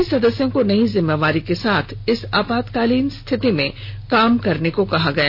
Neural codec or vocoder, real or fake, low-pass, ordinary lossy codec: none; real; 5.4 kHz; none